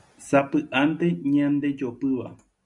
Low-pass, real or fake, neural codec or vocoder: 10.8 kHz; real; none